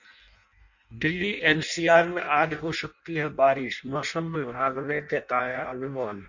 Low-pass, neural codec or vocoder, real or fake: 7.2 kHz; codec, 16 kHz in and 24 kHz out, 0.6 kbps, FireRedTTS-2 codec; fake